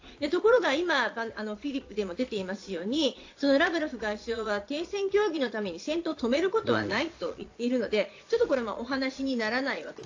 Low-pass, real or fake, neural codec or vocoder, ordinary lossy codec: 7.2 kHz; fake; vocoder, 22.05 kHz, 80 mel bands, Vocos; AAC, 48 kbps